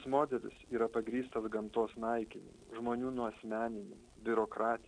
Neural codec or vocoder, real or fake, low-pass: none; real; 9.9 kHz